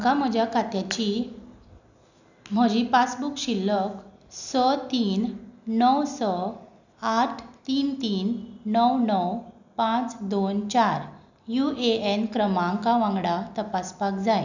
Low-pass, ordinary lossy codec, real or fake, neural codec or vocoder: 7.2 kHz; none; real; none